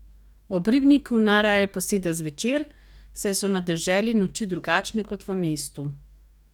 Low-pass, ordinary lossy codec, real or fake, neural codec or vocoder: 19.8 kHz; none; fake; codec, 44.1 kHz, 2.6 kbps, DAC